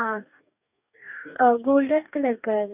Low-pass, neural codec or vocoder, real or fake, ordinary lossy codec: 3.6 kHz; codec, 44.1 kHz, 2.6 kbps, DAC; fake; none